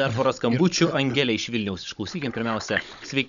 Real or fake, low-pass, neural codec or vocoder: fake; 7.2 kHz; codec, 16 kHz, 16 kbps, FunCodec, trained on Chinese and English, 50 frames a second